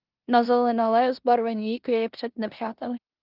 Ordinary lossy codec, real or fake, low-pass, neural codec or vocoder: Opus, 32 kbps; fake; 5.4 kHz; codec, 16 kHz in and 24 kHz out, 0.9 kbps, LongCat-Audio-Codec, four codebook decoder